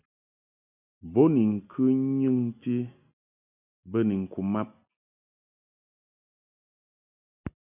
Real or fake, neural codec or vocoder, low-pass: real; none; 3.6 kHz